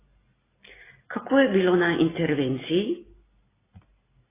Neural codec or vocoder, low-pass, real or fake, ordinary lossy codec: none; 3.6 kHz; real; AAC, 16 kbps